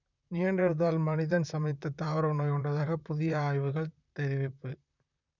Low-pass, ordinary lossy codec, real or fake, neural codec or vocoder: 7.2 kHz; none; fake; vocoder, 44.1 kHz, 128 mel bands, Pupu-Vocoder